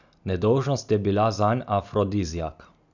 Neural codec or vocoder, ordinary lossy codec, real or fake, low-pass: none; none; real; 7.2 kHz